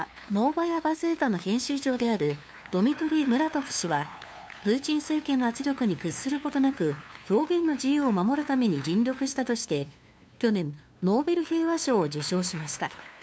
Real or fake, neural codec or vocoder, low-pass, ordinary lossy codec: fake; codec, 16 kHz, 2 kbps, FunCodec, trained on LibriTTS, 25 frames a second; none; none